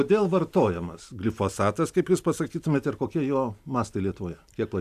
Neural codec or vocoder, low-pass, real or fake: none; 14.4 kHz; real